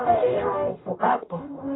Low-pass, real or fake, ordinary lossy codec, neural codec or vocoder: 7.2 kHz; fake; AAC, 16 kbps; codec, 44.1 kHz, 0.9 kbps, DAC